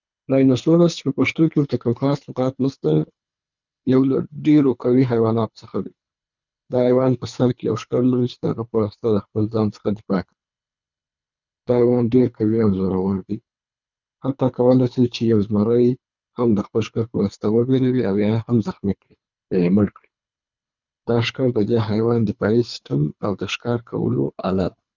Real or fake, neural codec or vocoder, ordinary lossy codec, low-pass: fake; codec, 24 kHz, 3 kbps, HILCodec; none; 7.2 kHz